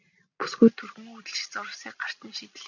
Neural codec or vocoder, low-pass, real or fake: none; 7.2 kHz; real